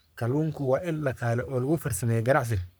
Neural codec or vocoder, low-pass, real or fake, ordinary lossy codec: codec, 44.1 kHz, 3.4 kbps, Pupu-Codec; none; fake; none